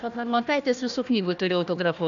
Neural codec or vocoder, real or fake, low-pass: codec, 16 kHz, 1 kbps, FunCodec, trained on Chinese and English, 50 frames a second; fake; 7.2 kHz